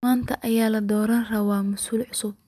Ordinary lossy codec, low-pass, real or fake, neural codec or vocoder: none; 14.4 kHz; fake; vocoder, 44.1 kHz, 128 mel bands every 256 samples, BigVGAN v2